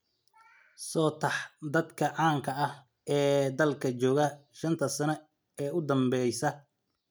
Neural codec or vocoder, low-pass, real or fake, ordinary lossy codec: none; none; real; none